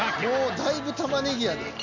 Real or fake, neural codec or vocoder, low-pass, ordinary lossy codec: real; none; 7.2 kHz; none